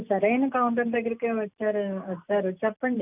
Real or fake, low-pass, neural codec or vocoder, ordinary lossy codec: real; 3.6 kHz; none; none